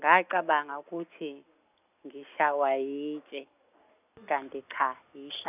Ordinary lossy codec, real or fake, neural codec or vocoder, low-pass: none; real; none; 3.6 kHz